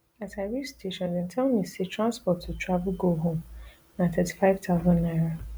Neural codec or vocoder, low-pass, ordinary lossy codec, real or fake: none; none; none; real